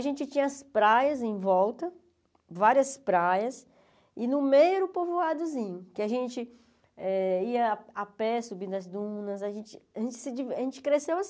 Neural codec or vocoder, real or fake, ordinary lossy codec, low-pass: none; real; none; none